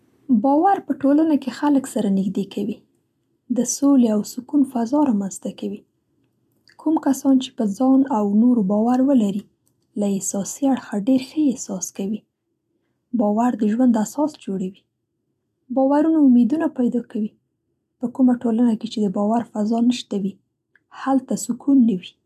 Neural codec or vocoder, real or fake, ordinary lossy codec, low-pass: none; real; none; 14.4 kHz